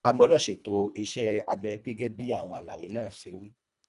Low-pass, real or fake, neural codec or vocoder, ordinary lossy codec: 10.8 kHz; fake; codec, 24 kHz, 1.5 kbps, HILCodec; none